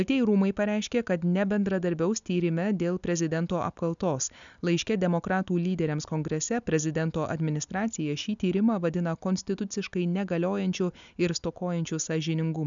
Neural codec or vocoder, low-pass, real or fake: none; 7.2 kHz; real